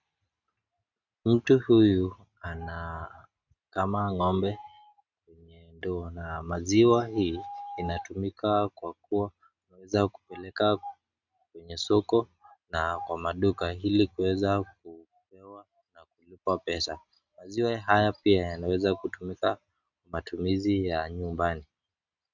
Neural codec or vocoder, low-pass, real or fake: none; 7.2 kHz; real